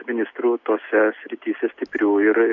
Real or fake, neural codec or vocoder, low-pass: real; none; 7.2 kHz